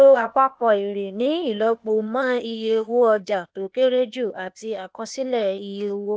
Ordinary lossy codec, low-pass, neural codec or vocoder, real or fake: none; none; codec, 16 kHz, 0.8 kbps, ZipCodec; fake